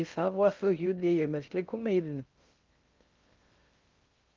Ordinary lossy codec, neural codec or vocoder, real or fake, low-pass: Opus, 24 kbps; codec, 16 kHz in and 24 kHz out, 0.6 kbps, FocalCodec, streaming, 2048 codes; fake; 7.2 kHz